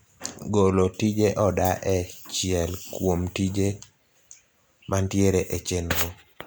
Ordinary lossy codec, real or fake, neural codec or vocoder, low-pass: none; real; none; none